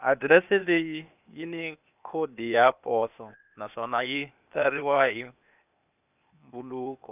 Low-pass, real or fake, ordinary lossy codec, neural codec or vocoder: 3.6 kHz; fake; none; codec, 16 kHz, 0.8 kbps, ZipCodec